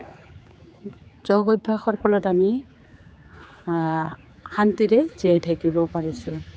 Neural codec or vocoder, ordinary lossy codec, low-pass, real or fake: codec, 16 kHz, 4 kbps, X-Codec, HuBERT features, trained on general audio; none; none; fake